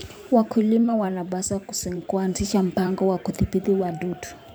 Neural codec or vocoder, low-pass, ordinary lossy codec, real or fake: vocoder, 44.1 kHz, 128 mel bands every 512 samples, BigVGAN v2; none; none; fake